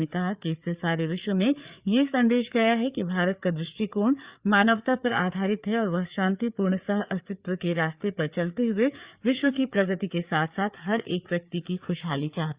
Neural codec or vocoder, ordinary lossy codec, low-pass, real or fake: codec, 16 kHz, 4 kbps, FreqCodec, larger model; Opus, 64 kbps; 3.6 kHz; fake